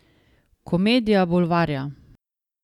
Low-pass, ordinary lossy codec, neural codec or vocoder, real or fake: 19.8 kHz; none; none; real